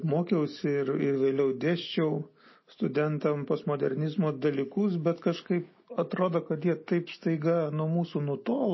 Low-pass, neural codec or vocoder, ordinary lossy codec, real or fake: 7.2 kHz; none; MP3, 24 kbps; real